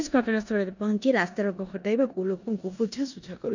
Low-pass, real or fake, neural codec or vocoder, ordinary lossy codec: 7.2 kHz; fake; codec, 16 kHz in and 24 kHz out, 0.9 kbps, LongCat-Audio-Codec, four codebook decoder; none